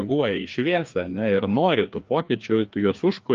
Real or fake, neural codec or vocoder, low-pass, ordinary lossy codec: fake; codec, 16 kHz, 2 kbps, FreqCodec, larger model; 7.2 kHz; Opus, 32 kbps